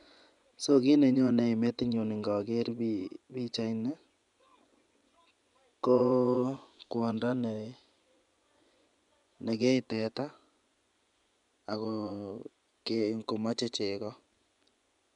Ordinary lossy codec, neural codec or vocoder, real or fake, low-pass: none; vocoder, 24 kHz, 100 mel bands, Vocos; fake; 10.8 kHz